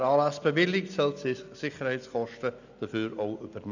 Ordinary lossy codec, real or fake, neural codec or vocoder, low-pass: none; real; none; 7.2 kHz